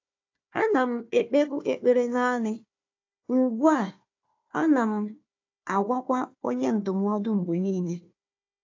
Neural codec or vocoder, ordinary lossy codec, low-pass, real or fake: codec, 16 kHz, 1 kbps, FunCodec, trained on Chinese and English, 50 frames a second; AAC, 48 kbps; 7.2 kHz; fake